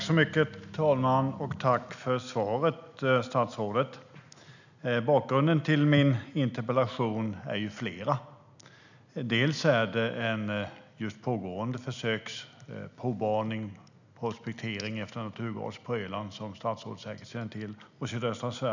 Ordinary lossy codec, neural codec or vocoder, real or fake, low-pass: none; none; real; 7.2 kHz